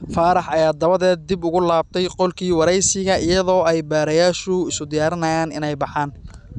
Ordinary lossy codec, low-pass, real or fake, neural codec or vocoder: none; 10.8 kHz; real; none